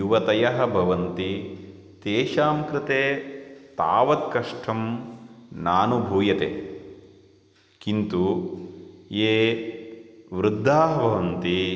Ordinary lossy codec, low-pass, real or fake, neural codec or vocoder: none; none; real; none